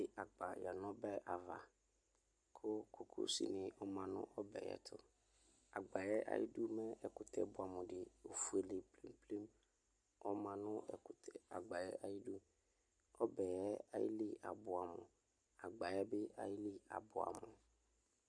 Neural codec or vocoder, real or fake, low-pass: none; real; 9.9 kHz